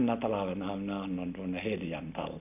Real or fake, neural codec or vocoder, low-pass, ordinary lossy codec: real; none; 3.6 kHz; none